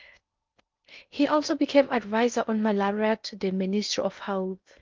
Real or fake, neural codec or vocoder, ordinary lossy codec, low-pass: fake; codec, 16 kHz in and 24 kHz out, 0.8 kbps, FocalCodec, streaming, 65536 codes; Opus, 32 kbps; 7.2 kHz